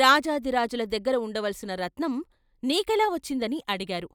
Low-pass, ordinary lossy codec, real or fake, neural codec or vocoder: 19.8 kHz; none; real; none